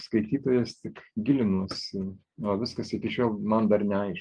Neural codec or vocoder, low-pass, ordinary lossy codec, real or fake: none; 9.9 kHz; Opus, 32 kbps; real